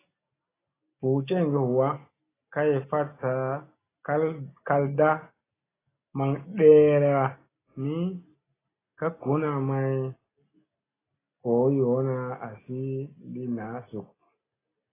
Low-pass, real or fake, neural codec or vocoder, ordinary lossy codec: 3.6 kHz; real; none; AAC, 16 kbps